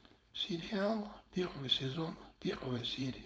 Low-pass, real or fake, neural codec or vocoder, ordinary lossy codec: none; fake; codec, 16 kHz, 4.8 kbps, FACodec; none